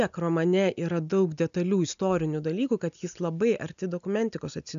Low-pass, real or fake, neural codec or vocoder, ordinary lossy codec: 7.2 kHz; real; none; AAC, 96 kbps